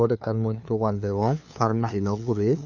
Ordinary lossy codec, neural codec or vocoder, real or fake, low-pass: none; codec, 16 kHz, 2 kbps, FunCodec, trained on LibriTTS, 25 frames a second; fake; 7.2 kHz